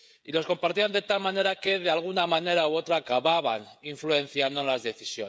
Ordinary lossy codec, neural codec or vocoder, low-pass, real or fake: none; codec, 16 kHz, 16 kbps, FreqCodec, smaller model; none; fake